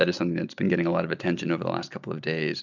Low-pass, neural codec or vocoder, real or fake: 7.2 kHz; none; real